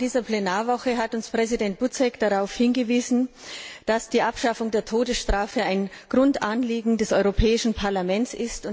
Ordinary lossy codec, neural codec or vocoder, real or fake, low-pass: none; none; real; none